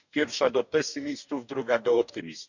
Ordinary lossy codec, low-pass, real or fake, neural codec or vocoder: none; 7.2 kHz; fake; codec, 44.1 kHz, 2.6 kbps, DAC